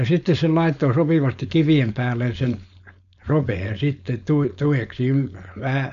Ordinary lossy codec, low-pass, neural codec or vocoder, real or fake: none; 7.2 kHz; codec, 16 kHz, 4.8 kbps, FACodec; fake